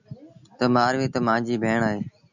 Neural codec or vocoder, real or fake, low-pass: none; real; 7.2 kHz